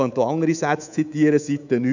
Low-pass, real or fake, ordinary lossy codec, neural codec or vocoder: 7.2 kHz; fake; none; codec, 24 kHz, 3.1 kbps, DualCodec